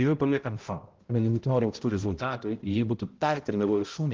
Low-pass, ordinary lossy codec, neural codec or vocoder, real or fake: 7.2 kHz; Opus, 16 kbps; codec, 16 kHz, 0.5 kbps, X-Codec, HuBERT features, trained on balanced general audio; fake